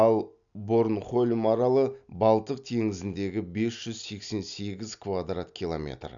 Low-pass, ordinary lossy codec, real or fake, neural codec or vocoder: 7.2 kHz; none; real; none